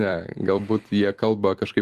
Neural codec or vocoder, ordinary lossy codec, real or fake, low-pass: vocoder, 44.1 kHz, 128 mel bands every 256 samples, BigVGAN v2; Opus, 24 kbps; fake; 14.4 kHz